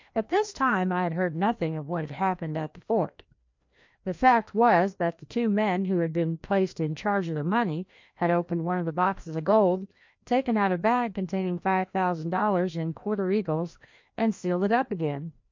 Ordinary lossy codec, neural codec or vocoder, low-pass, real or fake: MP3, 48 kbps; codec, 16 kHz, 1 kbps, FreqCodec, larger model; 7.2 kHz; fake